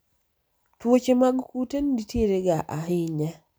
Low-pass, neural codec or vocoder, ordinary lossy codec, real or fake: none; none; none; real